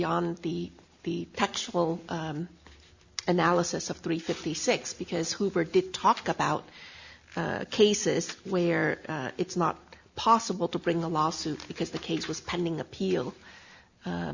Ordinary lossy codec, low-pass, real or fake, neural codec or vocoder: Opus, 64 kbps; 7.2 kHz; real; none